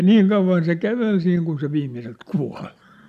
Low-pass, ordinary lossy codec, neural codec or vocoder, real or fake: 14.4 kHz; none; none; real